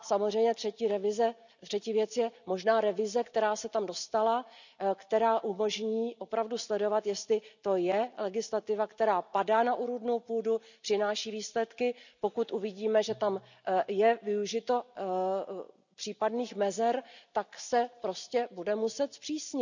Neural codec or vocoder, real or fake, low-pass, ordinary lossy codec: none; real; 7.2 kHz; none